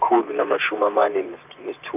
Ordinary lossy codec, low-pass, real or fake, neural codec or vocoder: none; 3.6 kHz; fake; vocoder, 44.1 kHz, 128 mel bands, Pupu-Vocoder